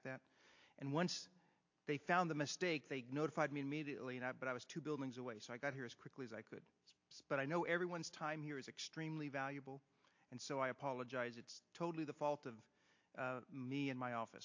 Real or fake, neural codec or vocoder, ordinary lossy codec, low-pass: real; none; AAC, 48 kbps; 7.2 kHz